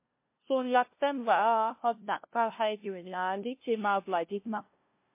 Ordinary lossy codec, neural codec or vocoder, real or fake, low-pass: MP3, 24 kbps; codec, 16 kHz, 0.5 kbps, FunCodec, trained on LibriTTS, 25 frames a second; fake; 3.6 kHz